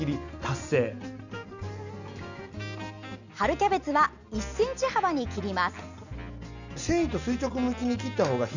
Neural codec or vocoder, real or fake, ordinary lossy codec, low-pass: none; real; none; 7.2 kHz